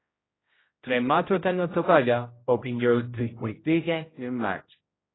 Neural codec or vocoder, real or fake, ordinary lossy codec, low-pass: codec, 16 kHz, 0.5 kbps, X-Codec, HuBERT features, trained on general audio; fake; AAC, 16 kbps; 7.2 kHz